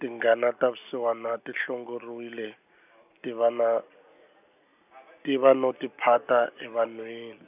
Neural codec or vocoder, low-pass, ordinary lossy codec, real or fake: none; 3.6 kHz; none; real